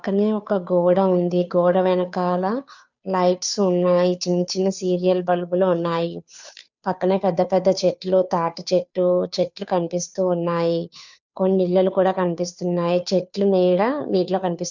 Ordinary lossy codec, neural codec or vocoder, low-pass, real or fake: none; codec, 16 kHz, 2 kbps, FunCodec, trained on Chinese and English, 25 frames a second; 7.2 kHz; fake